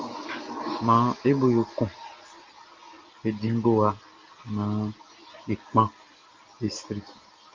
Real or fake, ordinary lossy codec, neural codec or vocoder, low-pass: real; Opus, 32 kbps; none; 7.2 kHz